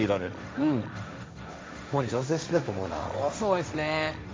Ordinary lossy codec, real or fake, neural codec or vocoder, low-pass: none; fake; codec, 16 kHz, 1.1 kbps, Voila-Tokenizer; none